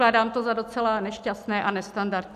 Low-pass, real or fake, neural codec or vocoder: 14.4 kHz; real; none